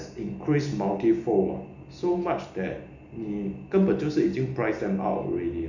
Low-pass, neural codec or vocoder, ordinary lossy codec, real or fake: 7.2 kHz; none; none; real